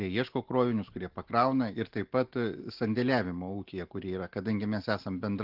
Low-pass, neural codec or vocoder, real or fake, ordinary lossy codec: 5.4 kHz; none; real; Opus, 16 kbps